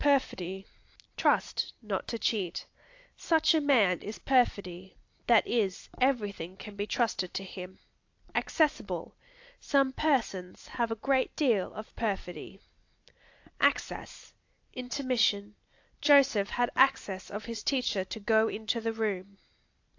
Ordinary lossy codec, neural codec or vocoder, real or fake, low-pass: AAC, 48 kbps; none; real; 7.2 kHz